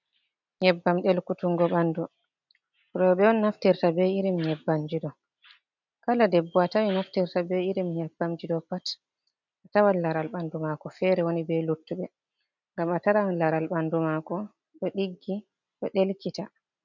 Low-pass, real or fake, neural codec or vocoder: 7.2 kHz; real; none